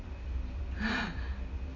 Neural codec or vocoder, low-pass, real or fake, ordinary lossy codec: none; 7.2 kHz; real; AAC, 32 kbps